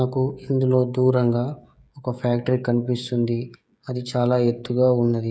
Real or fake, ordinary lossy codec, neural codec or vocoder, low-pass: fake; none; codec, 16 kHz, 16 kbps, FreqCodec, smaller model; none